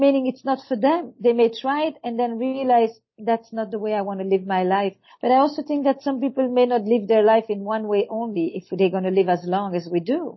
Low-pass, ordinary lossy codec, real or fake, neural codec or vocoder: 7.2 kHz; MP3, 24 kbps; real; none